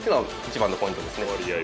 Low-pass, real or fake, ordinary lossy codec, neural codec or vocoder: none; real; none; none